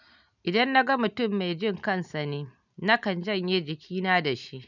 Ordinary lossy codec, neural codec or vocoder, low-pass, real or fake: none; none; 7.2 kHz; real